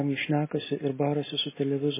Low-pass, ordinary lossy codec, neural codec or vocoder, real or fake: 3.6 kHz; MP3, 16 kbps; none; real